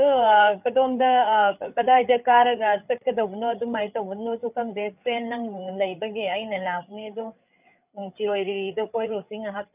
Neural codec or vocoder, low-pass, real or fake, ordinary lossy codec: codec, 16 kHz, 8 kbps, FreqCodec, larger model; 3.6 kHz; fake; none